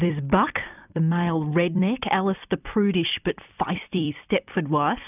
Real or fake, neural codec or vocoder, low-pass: fake; vocoder, 44.1 kHz, 128 mel bands every 256 samples, BigVGAN v2; 3.6 kHz